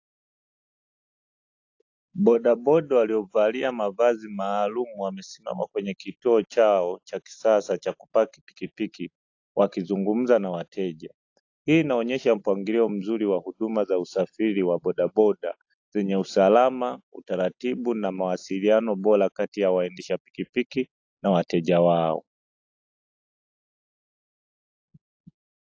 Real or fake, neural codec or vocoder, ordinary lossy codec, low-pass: real; none; AAC, 48 kbps; 7.2 kHz